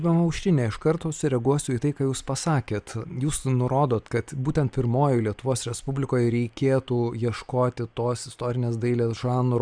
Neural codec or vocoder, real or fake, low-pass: none; real; 9.9 kHz